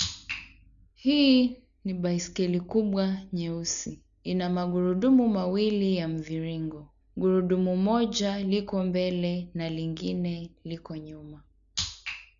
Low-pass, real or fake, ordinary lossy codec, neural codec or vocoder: 7.2 kHz; real; none; none